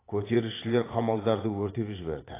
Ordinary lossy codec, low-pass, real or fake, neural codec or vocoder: AAC, 16 kbps; 3.6 kHz; fake; vocoder, 44.1 kHz, 80 mel bands, Vocos